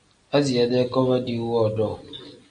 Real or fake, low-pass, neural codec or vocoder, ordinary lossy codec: real; 9.9 kHz; none; MP3, 64 kbps